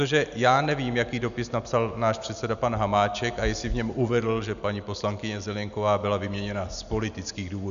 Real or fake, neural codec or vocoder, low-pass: real; none; 7.2 kHz